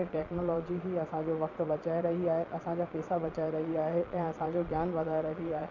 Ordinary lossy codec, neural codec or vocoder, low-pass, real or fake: none; vocoder, 22.05 kHz, 80 mel bands, Vocos; 7.2 kHz; fake